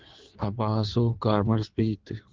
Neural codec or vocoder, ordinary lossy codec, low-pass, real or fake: codec, 16 kHz in and 24 kHz out, 1.1 kbps, FireRedTTS-2 codec; Opus, 16 kbps; 7.2 kHz; fake